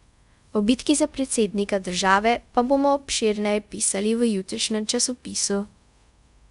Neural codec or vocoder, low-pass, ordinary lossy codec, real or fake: codec, 24 kHz, 0.5 kbps, DualCodec; 10.8 kHz; none; fake